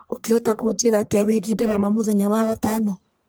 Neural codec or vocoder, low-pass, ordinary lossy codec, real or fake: codec, 44.1 kHz, 1.7 kbps, Pupu-Codec; none; none; fake